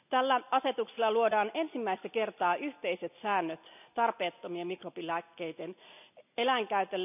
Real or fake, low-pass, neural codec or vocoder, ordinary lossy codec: real; 3.6 kHz; none; none